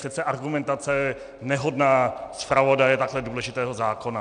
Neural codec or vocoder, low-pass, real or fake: none; 9.9 kHz; real